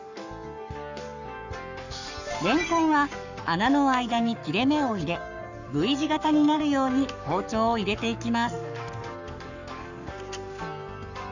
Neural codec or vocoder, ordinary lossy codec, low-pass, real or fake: codec, 44.1 kHz, 7.8 kbps, Pupu-Codec; none; 7.2 kHz; fake